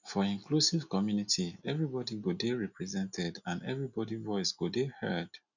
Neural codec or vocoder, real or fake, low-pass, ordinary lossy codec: none; real; 7.2 kHz; none